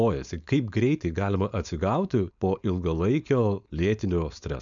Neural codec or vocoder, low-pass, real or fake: codec, 16 kHz, 4.8 kbps, FACodec; 7.2 kHz; fake